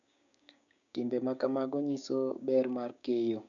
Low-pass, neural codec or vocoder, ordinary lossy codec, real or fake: 7.2 kHz; codec, 16 kHz, 6 kbps, DAC; none; fake